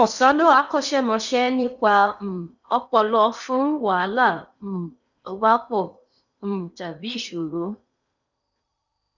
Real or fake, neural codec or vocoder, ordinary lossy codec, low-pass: fake; codec, 16 kHz in and 24 kHz out, 0.8 kbps, FocalCodec, streaming, 65536 codes; none; 7.2 kHz